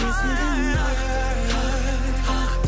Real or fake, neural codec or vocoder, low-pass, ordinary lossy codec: real; none; none; none